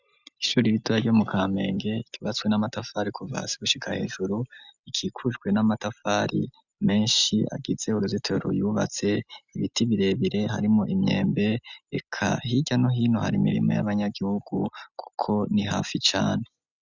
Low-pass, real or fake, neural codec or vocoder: 7.2 kHz; real; none